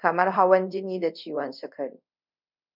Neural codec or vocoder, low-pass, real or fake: codec, 24 kHz, 0.5 kbps, DualCodec; 5.4 kHz; fake